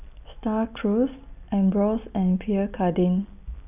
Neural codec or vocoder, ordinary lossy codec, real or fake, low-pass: none; none; real; 3.6 kHz